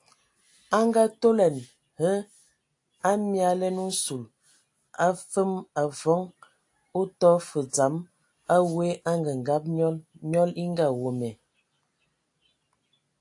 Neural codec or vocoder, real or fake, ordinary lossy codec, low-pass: none; real; AAC, 64 kbps; 10.8 kHz